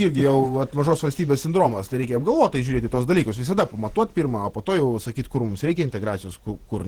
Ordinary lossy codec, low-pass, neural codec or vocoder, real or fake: Opus, 16 kbps; 19.8 kHz; vocoder, 48 kHz, 128 mel bands, Vocos; fake